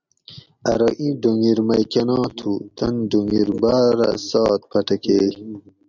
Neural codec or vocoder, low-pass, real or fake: none; 7.2 kHz; real